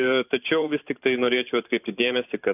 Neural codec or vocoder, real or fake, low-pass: vocoder, 44.1 kHz, 128 mel bands every 512 samples, BigVGAN v2; fake; 3.6 kHz